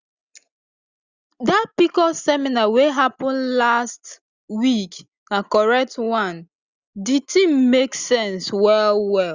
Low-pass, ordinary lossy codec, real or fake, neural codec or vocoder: 7.2 kHz; Opus, 64 kbps; real; none